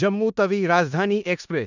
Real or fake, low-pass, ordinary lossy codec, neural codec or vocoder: fake; 7.2 kHz; none; codec, 16 kHz in and 24 kHz out, 0.9 kbps, LongCat-Audio-Codec, four codebook decoder